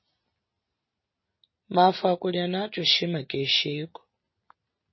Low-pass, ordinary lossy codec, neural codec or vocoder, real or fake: 7.2 kHz; MP3, 24 kbps; none; real